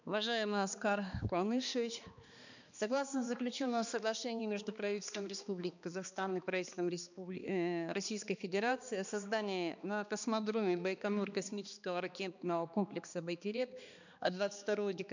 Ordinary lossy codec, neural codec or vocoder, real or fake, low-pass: none; codec, 16 kHz, 2 kbps, X-Codec, HuBERT features, trained on balanced general audio; fake; 7.2 kHz